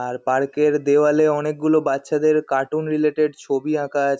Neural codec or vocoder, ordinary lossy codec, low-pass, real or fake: none; none; none; real